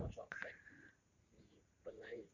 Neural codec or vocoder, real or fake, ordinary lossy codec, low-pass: vocoder, 44.1 kHz, 80 mel bands, Vocos; fake; Opus, 64 kbps; 7.2 kHz